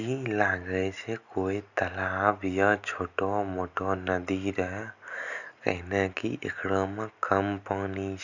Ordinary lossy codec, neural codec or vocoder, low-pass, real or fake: none; none; 7.2 kHz; real